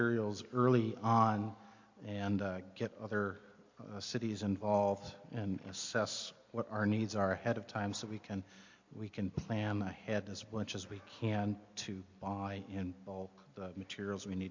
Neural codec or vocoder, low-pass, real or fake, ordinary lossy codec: none; 7.2 kHz; real; MP3, 48 kbps